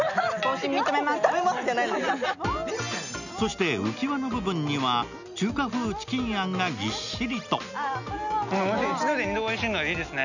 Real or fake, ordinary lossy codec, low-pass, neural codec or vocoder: real; none; 7.2 kHz; none